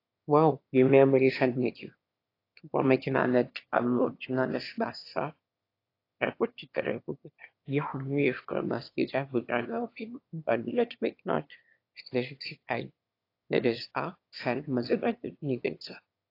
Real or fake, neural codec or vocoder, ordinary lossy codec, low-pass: fake; autoencoder, 22.05 kHz, a latent of 192 numbers a frame, VITS, trained on one speaker; AAC, 32 kbps; 5.4 kHz